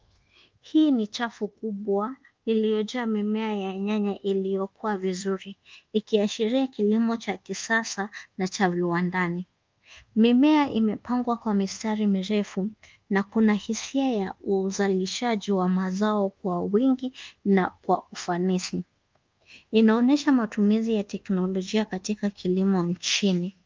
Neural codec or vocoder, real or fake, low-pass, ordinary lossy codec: codec, 24 kHz, 1.2 kbps, DualCodec; fake; 7.2 kHz; Opus, 24 kbps